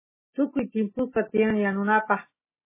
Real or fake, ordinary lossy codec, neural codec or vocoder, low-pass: real; MP3, 16 kbps; none; 3.6 kHz